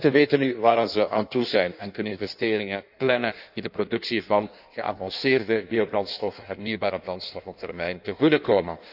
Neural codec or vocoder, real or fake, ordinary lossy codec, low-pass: codec, 16 kHz in and 24 kHz out, 1.1 kbps, FireRedTTS-2 codec; fake; none; 5.4 kHz